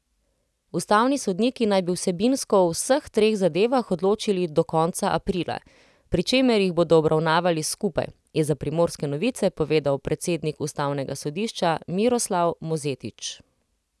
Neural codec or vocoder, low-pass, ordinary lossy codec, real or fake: none; none; none; real